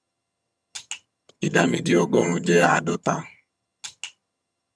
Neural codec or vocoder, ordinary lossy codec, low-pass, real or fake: vocoder, 22.05 kHz, 80 mel bands, HiFi-GAN; none; none; fake